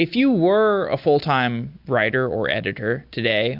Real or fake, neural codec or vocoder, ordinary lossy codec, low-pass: real; none; MP3, 48 kbps; 5.4 kHz